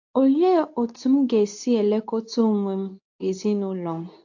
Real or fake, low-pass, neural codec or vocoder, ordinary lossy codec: fake; 7.2 kHz; codec, 24 kHz, 0.9 kbps, WavTokenizer, medium speech release version 2; AAC, 48 kbps